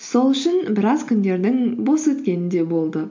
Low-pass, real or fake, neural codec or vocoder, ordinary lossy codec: 7.2 kHz; real; none; MP3, 48 kbps